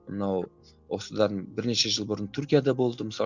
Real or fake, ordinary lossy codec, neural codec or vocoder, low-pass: real; none; none; 7.2 kHz